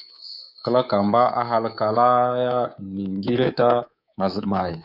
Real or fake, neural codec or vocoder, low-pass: fake; codec, 24 kHz, 3.1 kbps, DualCodec; 5.4 kHz